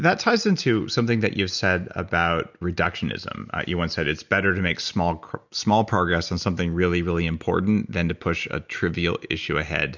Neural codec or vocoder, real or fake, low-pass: none; real; 7.2 kHz